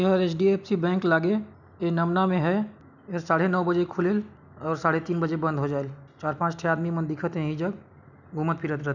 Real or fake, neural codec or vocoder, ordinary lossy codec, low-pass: real; none; none; 7.2 kHz